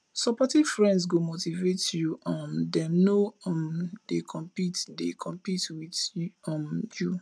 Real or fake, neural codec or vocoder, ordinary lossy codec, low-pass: real; none; none; none